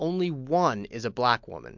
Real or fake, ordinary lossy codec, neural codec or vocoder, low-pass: real; MP3, 64 kbps; none; 7.2 kHz